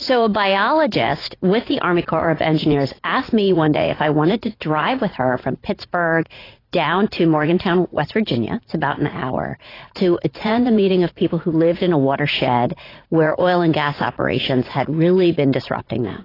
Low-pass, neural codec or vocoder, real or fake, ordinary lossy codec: 5.4 kHz; vocoder, 44.1 kHz, 80 mel bands, Vocos; fake; AAC, 24 kbps